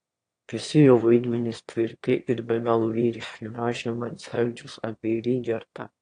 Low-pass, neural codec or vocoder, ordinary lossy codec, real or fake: 9.9 kHz; autoencoder, 22.05 kHz, a latent of 192 numbers a frame, VITS, trained on one speaker; AAC, 48 kbps; fake